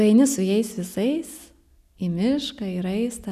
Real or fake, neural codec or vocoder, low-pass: real; none; 14.4 kHz